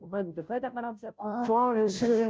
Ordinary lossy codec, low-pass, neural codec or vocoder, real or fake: none; none; codec, 16 kHz, 0.5 kbps, FunCodec, trained on Chinese and English, 25 frames a second; fake